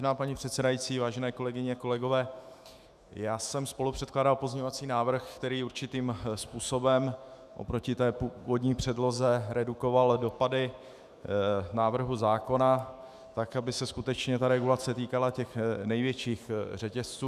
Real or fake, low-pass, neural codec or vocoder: fake; 14.4 kHz; autoencoder, 48 kHz, 128 numbers a frame, DAC-VAE, trained on Japanese speech